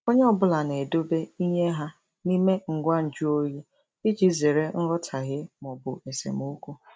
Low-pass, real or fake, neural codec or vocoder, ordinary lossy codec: none; real; none; none